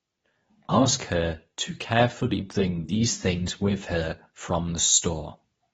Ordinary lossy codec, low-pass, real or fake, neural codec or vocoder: AAC, 24 kbps; 10.8 kHz; fake; codec, 24 kHz, 0.9 kbps, WavTokenizer, medium speech release version 2